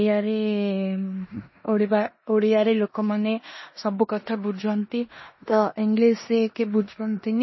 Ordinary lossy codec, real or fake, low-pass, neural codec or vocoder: MP3, 24 kbps; fake; 7.2 kHz; codec, 16 kHz in and 24 kHz out, 0.9 kbps, LongCat-Audio-Codec, four codebook decoder